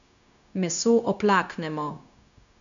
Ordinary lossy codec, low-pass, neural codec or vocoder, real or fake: none; 7.2 kHz; codec, 16 kHz, 0.9 kbps, LongCat-Audio-Codec; fake